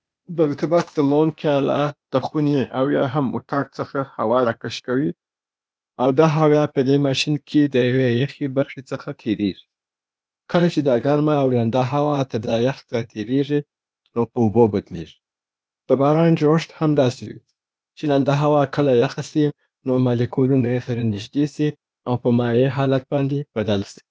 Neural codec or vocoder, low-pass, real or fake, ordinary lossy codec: codec, 16 kHz, 0.8 kbps, ZipCodec; none; fake; none